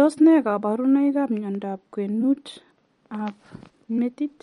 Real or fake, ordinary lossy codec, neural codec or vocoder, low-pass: fake; MP3, 48 kbps; vocoder, 44.1 kHz, 128 mel bands every 256 samples, BigVGAN v2; 19.8 kHz